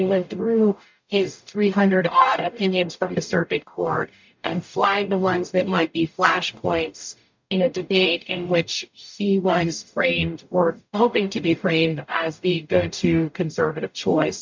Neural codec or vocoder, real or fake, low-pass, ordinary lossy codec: codec, 44.1 kHz, 0.9 kbps, DAC; fake; 7.2 kHz; MP3, 64 kbps